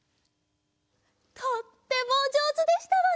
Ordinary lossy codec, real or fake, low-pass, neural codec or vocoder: none; real; none; none